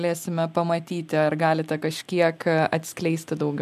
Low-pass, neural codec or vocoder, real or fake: 14.4 kHz; none; real